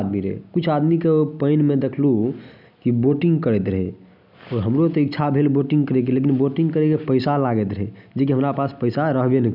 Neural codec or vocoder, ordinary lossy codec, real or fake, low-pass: none; none; real; 5.4 kHz